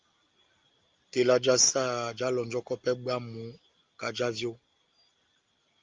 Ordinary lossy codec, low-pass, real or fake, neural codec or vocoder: Opus, 32 kbps; 7.2 kHz; real; none